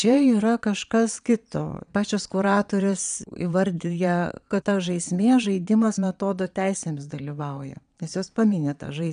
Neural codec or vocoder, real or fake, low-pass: vocoder, 22.05 kHz, 80 mel bands, Vocos; fake; 9.9 kHz